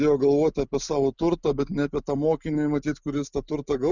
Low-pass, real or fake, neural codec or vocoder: 7.2 kHz; real; none